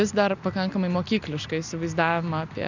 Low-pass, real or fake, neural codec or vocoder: 7.2 kHz; real; none